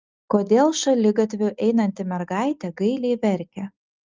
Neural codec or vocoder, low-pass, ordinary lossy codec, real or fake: none; 7.2 kHz; Opus, 32 kbps; real